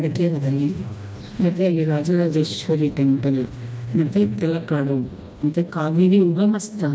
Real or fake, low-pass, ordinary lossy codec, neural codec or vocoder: fake; none; none; codec, 16 kHz, 1 kbps, FreqCodec, smaller model